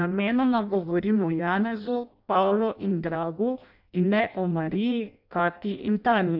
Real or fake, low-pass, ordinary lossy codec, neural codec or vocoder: fake; 5.4 kHz; none; codec, 16 kHz in and 24 kHz out, 0.6 kbps, FireRedTTS-2 codec